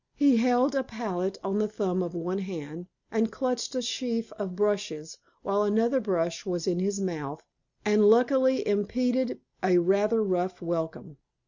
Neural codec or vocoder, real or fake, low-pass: none; real; 7.2 kHz